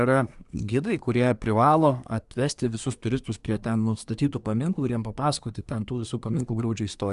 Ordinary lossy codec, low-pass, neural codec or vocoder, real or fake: Opus, 64 kbps; 10.8 kHz; codec, 24 kHz, 1 kbps, SNAC; fake